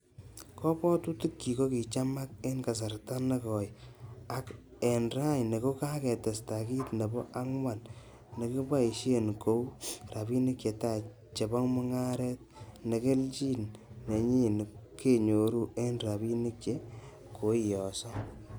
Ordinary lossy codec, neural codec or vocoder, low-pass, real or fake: none; none; none; real